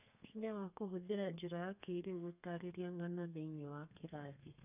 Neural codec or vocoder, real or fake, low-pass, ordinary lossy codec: codec, 32 kHz, 1.9 kbps, SNAC; fake; 3.6 kHz; none